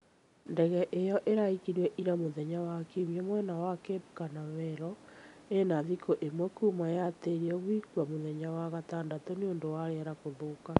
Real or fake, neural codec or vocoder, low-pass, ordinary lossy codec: real; none; 10.8 kHz; none